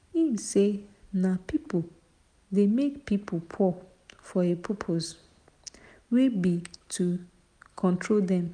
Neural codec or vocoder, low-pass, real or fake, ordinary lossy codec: none; 9.9 kHz; real; none